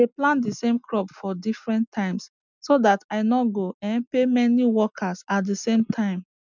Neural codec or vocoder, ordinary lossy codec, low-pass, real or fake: none; none; 7.2 kHz; real